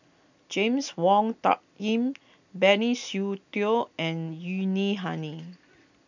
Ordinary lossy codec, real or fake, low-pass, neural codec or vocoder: none; real; 7.2 kHz; none